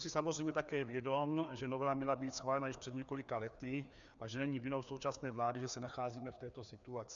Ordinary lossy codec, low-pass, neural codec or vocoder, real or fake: MP3, 96 kbps; 7.2 kHz; codec, 16 kHz, 2 kbps, FreqCodec, larger model; fake